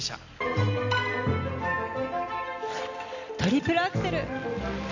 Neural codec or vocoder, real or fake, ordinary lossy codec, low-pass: none; real; none; 7.2 kHz